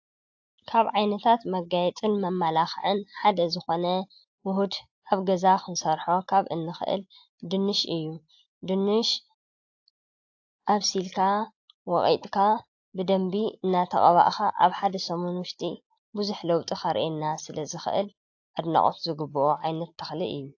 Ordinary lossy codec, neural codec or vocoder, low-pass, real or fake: AAC, 48 kbps; none; 7.2 kHz; real